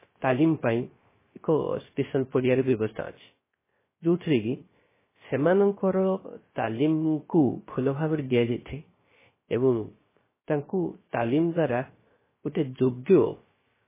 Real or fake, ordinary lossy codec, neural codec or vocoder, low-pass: fake; MP3, 16 kbps; codec, 16 kHz, 0.3 kbps, FocalCodec; 3.6 kHz